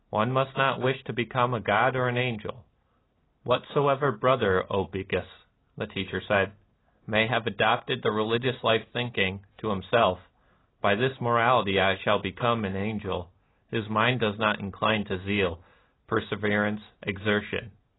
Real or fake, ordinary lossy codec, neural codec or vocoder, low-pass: real; AAC, 16 kbps; none; 7.2 kHz